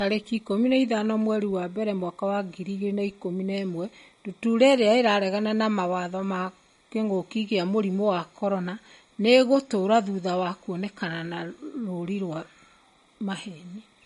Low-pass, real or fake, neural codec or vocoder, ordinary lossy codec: 19.8 kHz; real; none; MP3, 48 kbps